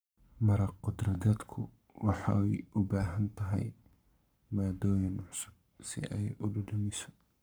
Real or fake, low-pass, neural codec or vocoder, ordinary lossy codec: fake; none; codec, 44.1 kHz, 7.8 kbps, Pupu-Codec; none